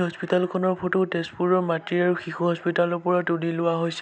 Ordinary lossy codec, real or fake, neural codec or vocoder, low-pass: none; real; none; none